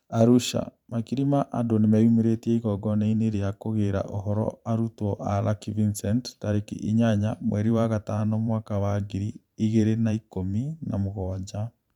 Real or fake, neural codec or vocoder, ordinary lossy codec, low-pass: fake; vocoder, 44.1 kHz, 128 mel bands every 512 samples, BigVGAN v2; none; 19.8 kHz